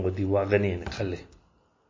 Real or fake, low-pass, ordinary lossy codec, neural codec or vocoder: real; 7.2 kHz; MP3, 32 kbps; none